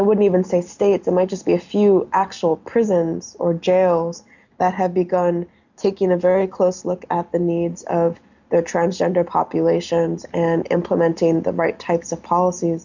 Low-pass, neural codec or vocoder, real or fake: 7.2 kHz; none; real